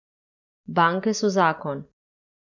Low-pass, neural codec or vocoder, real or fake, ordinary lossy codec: 7.2 kHz; none; real; none